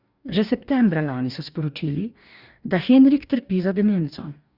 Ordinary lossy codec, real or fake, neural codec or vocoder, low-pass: Opus, 64 kbps; fake; codec, 44.1 kHz, 2.6 kbps, DAC; 5.4 kHz